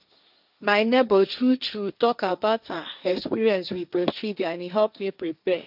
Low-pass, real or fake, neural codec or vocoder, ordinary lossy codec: 5.4 kHz; fake; codec, 16 kHz, 1.1 kbps, Voila-Tokenizer; none